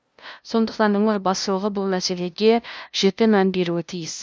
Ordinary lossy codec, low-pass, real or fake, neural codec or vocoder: none; none; fake; codec, 16 kHz, 0.5 kbps, FunCodec, trained on LibriTTS, 25 frames a second